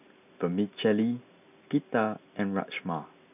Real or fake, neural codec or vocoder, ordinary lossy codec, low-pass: real; none; none; 3.6 kHz